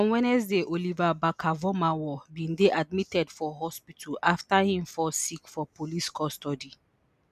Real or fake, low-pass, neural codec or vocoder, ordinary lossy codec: real; 14.4 kHz; none; none